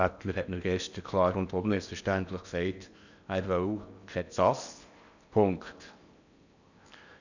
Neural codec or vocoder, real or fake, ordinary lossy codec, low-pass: codec, 16 kHz in and 24 kHz out, 0.8 kbps, FocalCodec, streaming, 65536 codes; fake; none; 7.2 kHz